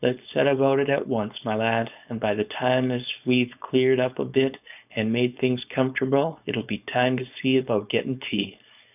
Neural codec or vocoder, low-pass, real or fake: codec, 16 kHz, 4.8 kbps, FACodec; 3.6 kHz; fake